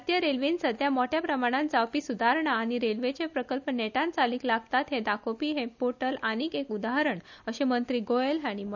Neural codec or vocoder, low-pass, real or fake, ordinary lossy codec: none; 7.2 kHz; real; none